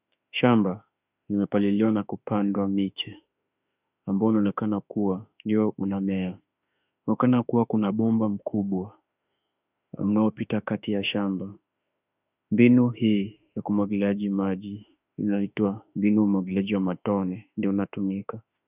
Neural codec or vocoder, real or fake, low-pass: autoencoder, 48 kHz, 32 numbers a frame, DAC-VAE, trained on Japanese speech; fake; 3.6 kHz